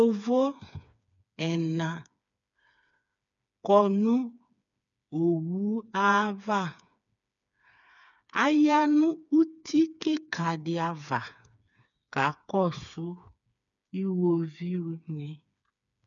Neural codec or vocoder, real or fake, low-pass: codec, 16 kHz, 4 kbps, FreqCodec, smaller model; fake; 7.2 kHz